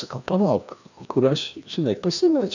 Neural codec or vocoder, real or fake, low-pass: codec, 16 kHz, 1 kbps, FreqCodec, larger model; fake; 7.2 kHz